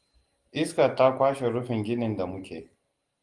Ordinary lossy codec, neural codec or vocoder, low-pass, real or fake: Opus, 24 kbps; none; 10.8 kHz; real